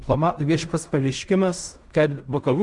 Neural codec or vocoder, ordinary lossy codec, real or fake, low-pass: codec, 16 kHz in and 24 kHz out, 0.4 kbps, LongCat-Audio-Codec, fine tuned four codebook decoder; Opus, 64 kbps; fake; 10.8 kHz